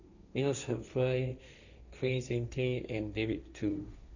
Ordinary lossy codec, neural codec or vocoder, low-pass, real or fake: none; codec, 16 kHz, 1.1 kbps, Voila-Tokenizer; 7.2 kHz; fake